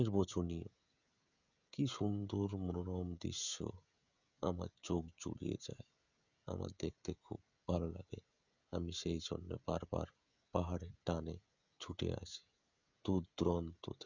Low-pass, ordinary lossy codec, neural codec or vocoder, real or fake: 7.2 kHz; none; codec, 16 kHz, 16 kbps, FreqCodec, smaller model; fake